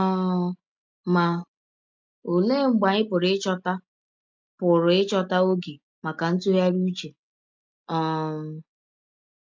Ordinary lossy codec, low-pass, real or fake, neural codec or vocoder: MP3, 64 kbps; 7.2 kHz; real; none